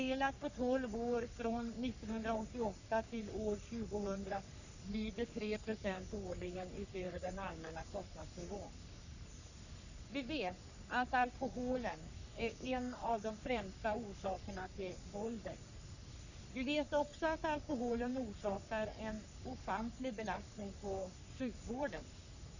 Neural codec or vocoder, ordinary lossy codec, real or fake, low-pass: codec, 44.1 kHz, 3.4 kbps, Pupu-Codec; none; fake; 7.2 kHz